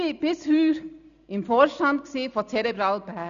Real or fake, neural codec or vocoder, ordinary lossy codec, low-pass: real; none; none; 7.2 kHz